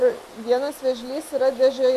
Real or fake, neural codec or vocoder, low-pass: real; none; 14.4 kHz